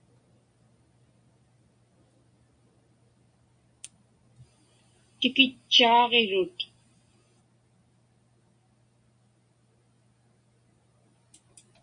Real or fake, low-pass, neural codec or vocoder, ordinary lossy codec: real; 9.9 kHz; none; MP3, 96 kbps